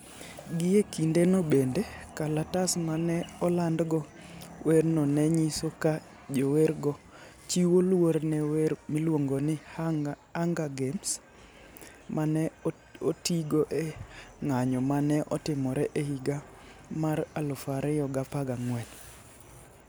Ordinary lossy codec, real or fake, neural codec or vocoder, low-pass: none; real; none; none